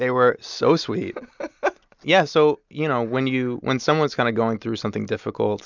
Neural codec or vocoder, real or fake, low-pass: none; real; 7.2 kHz